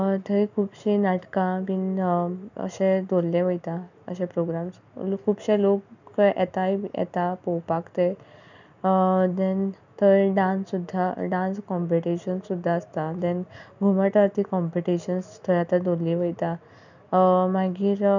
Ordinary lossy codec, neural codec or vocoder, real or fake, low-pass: none; none; real; 7.2 kHz